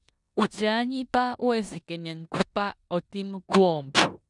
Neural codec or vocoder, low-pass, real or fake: codec, 16 kHz in and 24 kHz out, 0.9 kbps, LongCat-Audio-Codec, four codebook decoder; 10.8 kHz; fake